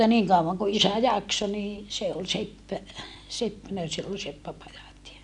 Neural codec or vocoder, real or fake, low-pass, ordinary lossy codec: none; real; 10.8 kHz; none